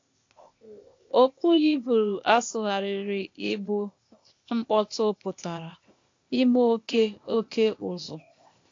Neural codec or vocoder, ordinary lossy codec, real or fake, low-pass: codec, 16 kHz, 0.8 kbps, ZipCodec; AAC, 48 kbps; fake; 7.2 kHz